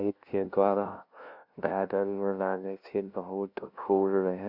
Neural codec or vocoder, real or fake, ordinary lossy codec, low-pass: codec, 16 kHz, 0.5 kbps, FunCodec, trained on LibriTTS, 25 frames a second; fake; none; 5.4 kHz